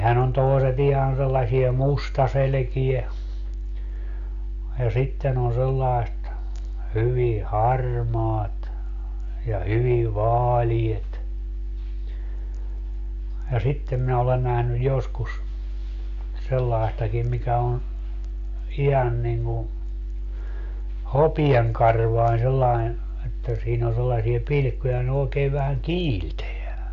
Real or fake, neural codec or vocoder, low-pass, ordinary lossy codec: real; none; 7.2 kHz; AAC, 64 kbps